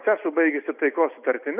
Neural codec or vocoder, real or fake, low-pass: none; real; 3.6 kHz